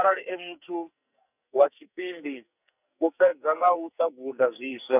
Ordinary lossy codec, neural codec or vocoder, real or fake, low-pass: none; codec, 44.1 kHz, 2.6 kbps, SNAC; fake; 3.6 kHz